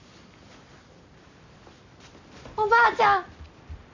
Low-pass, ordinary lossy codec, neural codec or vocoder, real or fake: 7.2 kHz; none; none; real